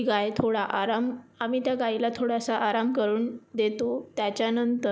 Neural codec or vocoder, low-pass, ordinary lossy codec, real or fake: none; none; none; real